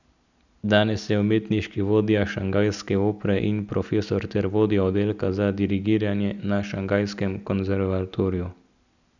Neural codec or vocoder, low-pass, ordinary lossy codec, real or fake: none; 7.2 kHz; none; real